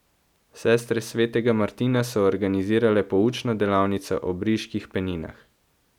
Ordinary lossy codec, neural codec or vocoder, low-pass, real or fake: none; none; 19.8 kHz; real